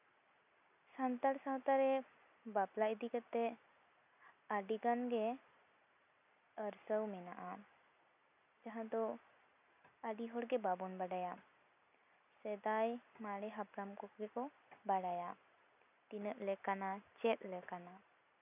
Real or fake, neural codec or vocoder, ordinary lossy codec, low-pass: real; none; none; 3.6 kHz